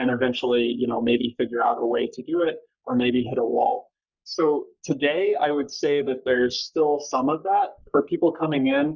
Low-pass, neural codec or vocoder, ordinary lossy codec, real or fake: 7.2 kHz; codec, 44.1 kHz, 3.4 kbps, Pupu-Codec; Opus, 64 kbps; fake